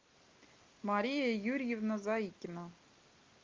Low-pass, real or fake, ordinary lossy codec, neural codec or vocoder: 7.2 kHz; real; Opus, 24 kbps; none